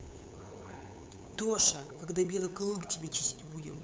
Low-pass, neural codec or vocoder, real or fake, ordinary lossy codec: none; codec, 16 kHz, 8 kbps, FunCodec, trained on LibriTTS, 25 frames a second; fake; none